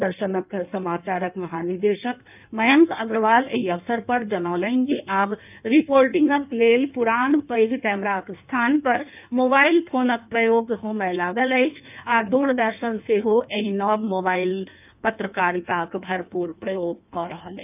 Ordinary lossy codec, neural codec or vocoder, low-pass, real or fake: none; codec, 16 kHz in and 24 kHz out, 1.1 kbps, FireRedTTS-2 codec; 3.6 kHz; fake